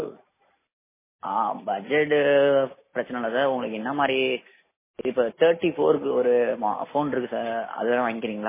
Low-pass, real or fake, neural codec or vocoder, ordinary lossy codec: 3.6 kHz; real; none; MP3, 16 kbps